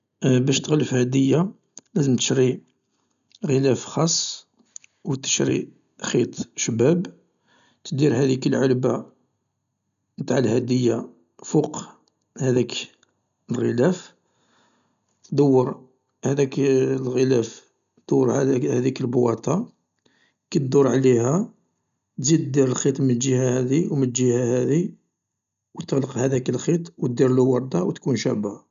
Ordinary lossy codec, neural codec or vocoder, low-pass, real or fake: none; none; 7.2 kHz; real